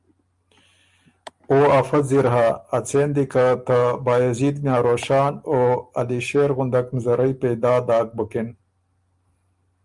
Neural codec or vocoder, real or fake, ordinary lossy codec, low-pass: none; real; Opus, 32 kbps; 10.8 kHz